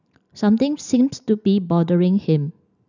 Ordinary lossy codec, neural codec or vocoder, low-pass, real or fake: none; none; 7.2 kHz; real